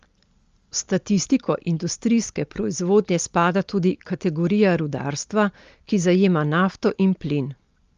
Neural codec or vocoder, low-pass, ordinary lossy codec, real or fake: none; 7.2 kHz; Opus, 32 kbps; real